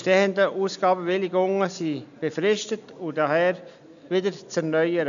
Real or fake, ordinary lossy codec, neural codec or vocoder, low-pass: real; none; none; 7.2 kHz